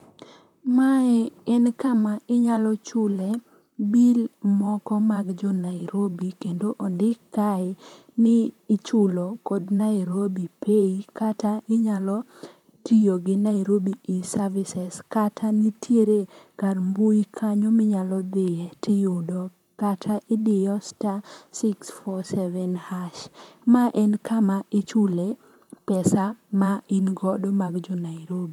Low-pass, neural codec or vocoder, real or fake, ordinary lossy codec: 19.8 kHz; vocoder, 44.1 kHz, 128 mel bands, Pupu-Vocoder; fake; none